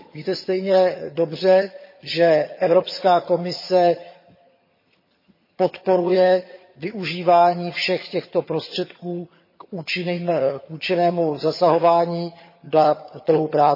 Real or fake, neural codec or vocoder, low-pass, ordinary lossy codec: fake; vocoder, 22.05 kHz, 80 mel bands, HiFi-GAN; 5.4 kHz; MP3, 24 kbps